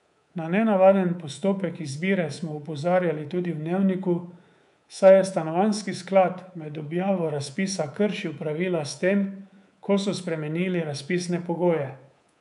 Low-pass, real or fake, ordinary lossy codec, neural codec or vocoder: 10.8 kHz; fake; none; codec, 24 kHz, 3.1 kbps, DualCodec